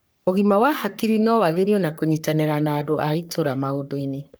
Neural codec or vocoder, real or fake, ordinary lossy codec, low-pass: codec, 44.1 kHz, 3.4 kbps, Pupu-Codec; fake; none; none